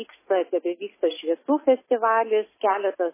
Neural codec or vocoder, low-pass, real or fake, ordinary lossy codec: none; 3.6 kHz; real; MP3, 16 kbps